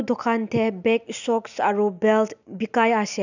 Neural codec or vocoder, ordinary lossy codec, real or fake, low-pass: none; none; real; 7.2 kHz